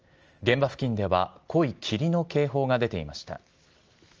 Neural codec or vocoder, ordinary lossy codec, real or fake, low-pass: none; Opus, 24 kbps; real; 7.2 kHz